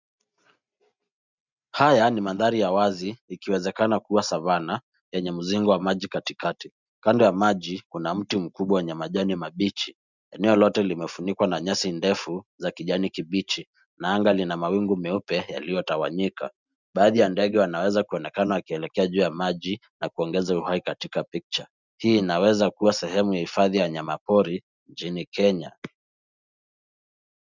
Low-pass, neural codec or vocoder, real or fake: 7.2 kHz; none; real